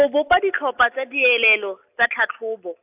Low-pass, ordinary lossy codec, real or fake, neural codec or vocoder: 3.6 kHz; AAC, 32 kbps; real; none